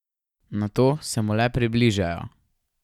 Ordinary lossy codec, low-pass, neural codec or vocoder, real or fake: none; 19.8 kHz; none; real